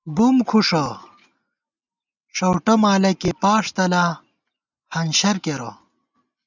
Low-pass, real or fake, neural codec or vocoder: 7.2 kHz; real; none